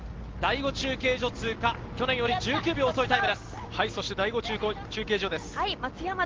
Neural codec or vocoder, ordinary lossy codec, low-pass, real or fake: none; Opus, 16 kbps; 7.2 kHz; real